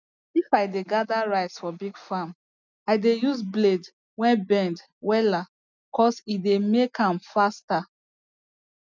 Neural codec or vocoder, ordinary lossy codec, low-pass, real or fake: none; none; 7.2 kHz; real